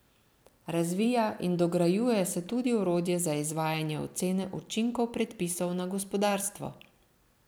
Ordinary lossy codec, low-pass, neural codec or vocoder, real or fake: none; none; vocoder, 44.1 kHz, 128 mel bands every 256 samples, BigVGAN v2; fake